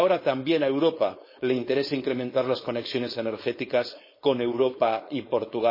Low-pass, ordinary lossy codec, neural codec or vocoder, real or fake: 5.4 kHz; MP3, 24 kbps; codec, 16 kHz, 4.8 kbps, FACodec; fake